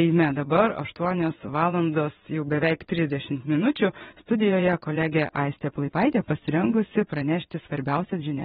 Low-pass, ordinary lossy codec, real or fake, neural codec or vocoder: 9.9 kHz; AAC, 16 kbps; fake; vocoder, 22.05 kHz, 80 mel bands, WaveNeXt